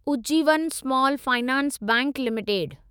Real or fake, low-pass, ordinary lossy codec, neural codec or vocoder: fake; none; none; autoencoder, 48 kHz, 128 numbers a frame, DAC-VAE, trained on Japanese speech